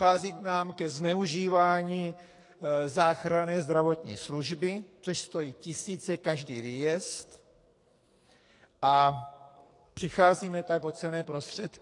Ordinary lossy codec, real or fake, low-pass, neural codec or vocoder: AAC, 48 kbps; fake; 10.8 kHz; codec, 44.1 kHz, 2.6 kbps, SNAC